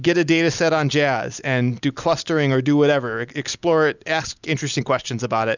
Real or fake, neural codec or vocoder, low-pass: real; none; 7.2 kHz